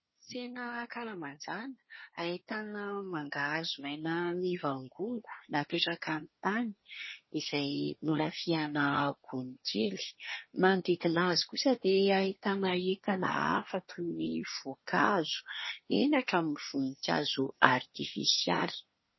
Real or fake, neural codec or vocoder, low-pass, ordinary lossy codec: fake; codec, 24 kHz, 1 kbps, SNAC; 7.2 kHz; MP3, 24 kbps